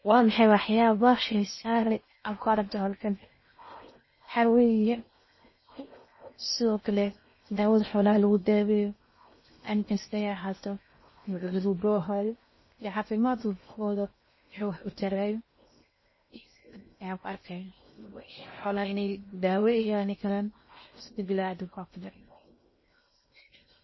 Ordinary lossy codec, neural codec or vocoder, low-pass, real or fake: MP3, 24 kbps; codec, 16 kHz in and 24 kHz out, 0.6 kbps, FocalCodec, streaming, 4096 codes; 7.2 kHz; fake